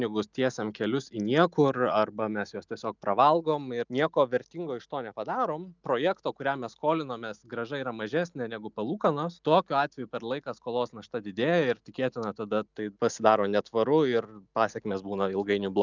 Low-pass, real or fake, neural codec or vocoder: 7.2 kHz; real; none